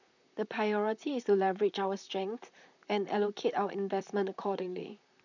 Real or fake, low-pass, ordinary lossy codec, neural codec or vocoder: fake; 7.2 kHz; none; vocoder, 44.1 kHz, 128 mel bands, Pupu-Vocoder